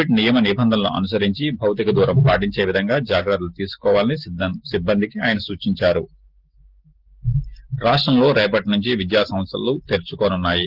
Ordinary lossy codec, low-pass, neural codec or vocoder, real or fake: Opus, 16 kbps; 5.4 kHz; none; real